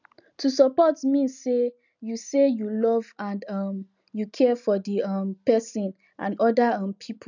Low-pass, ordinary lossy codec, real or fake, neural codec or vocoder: 7.2 kHz; none; real; none